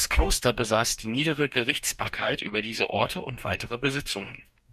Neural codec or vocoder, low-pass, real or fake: codec, 44.1 kHz, 2.6 kbps, DAC; 14.4 kHz; fake